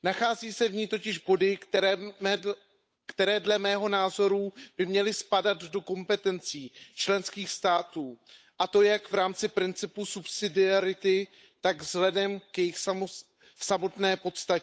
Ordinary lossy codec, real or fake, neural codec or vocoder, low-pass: none; fake; codec, 16 kHz, 8 kbps, FunCodec, trained on Chinese and English, 25 frames a second; none